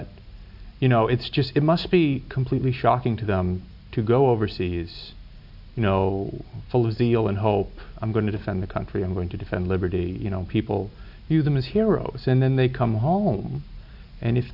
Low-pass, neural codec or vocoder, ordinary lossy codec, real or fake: 5.4 kHz; none; AAC, 48 kbps; real